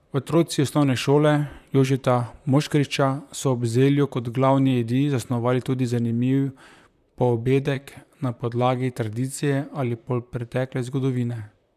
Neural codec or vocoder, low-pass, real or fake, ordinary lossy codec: vocoder, 44.1 kHz, 128 mel bands, Pupu-Vocoder; 14.4 kHz; fake; none